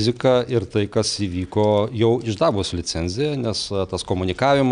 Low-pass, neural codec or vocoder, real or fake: 9.9 kHz; none; real